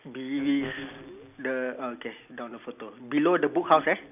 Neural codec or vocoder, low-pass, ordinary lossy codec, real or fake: none; 3.6 kHz; none; real